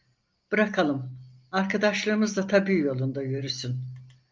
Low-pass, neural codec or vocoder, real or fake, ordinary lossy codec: 7.2 kHz; none; real; Opus, 24 kbps